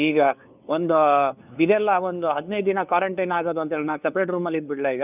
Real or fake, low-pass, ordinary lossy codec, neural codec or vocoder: fake; 3.6 kHz; none; codec, 16 kHz, 2 kbps, FunCodec, trained on LibriTTS, 25 frames a second